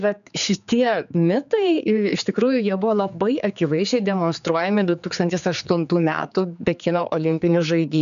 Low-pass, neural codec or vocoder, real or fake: 7.2 kHz; codec, 16 kHz, 4 kbps, X-Codec, HuBERT features, trained on general audio; fake